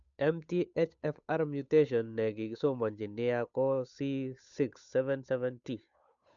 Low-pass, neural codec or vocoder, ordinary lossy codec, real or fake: 7.2 kHz; codec, 16 kHz, 8 kbps, FunCodec, trained on Chinese and English, 25 frames a second; none; fake